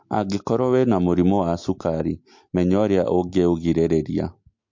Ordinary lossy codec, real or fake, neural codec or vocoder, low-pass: MP3, 48 kbps; real; none; 7.2 kHz